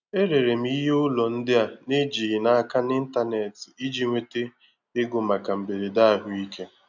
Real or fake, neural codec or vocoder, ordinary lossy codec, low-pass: real; none; none; 7.2 kHz